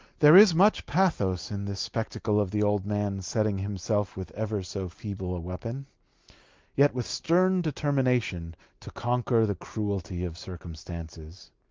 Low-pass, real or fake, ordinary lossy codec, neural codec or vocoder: 7.2 kHz; real; Opus, 32 kbps; none